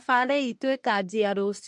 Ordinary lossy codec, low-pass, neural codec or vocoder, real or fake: MP3, 48 kbps; 10.8 kHz; codec, 24 kHz, 1 kbps, SNAC; fake